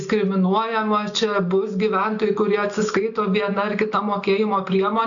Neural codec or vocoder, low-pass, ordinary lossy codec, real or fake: none; 7.2 kHz; MP3, 96 kbps; real